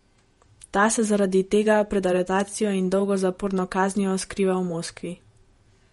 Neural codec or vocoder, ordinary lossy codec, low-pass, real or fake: vocoder, 48 kHz, 128 mel bands, Vocos; MP3, 48 kbps; 19.8 kHz; fake